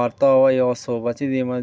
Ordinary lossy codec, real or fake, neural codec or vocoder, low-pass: none; real; none; none